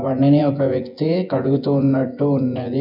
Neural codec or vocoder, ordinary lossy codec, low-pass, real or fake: vocoder, 24 kHz, 100 mel bands, Vocos; none; 5.4 kHz; fake